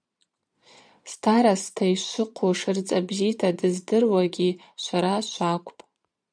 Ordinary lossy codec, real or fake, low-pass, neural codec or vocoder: MP3, 96 kbps; fake; 9.9 kHz; vocoder, 22.05 kHz, 80 mel bands, Vocos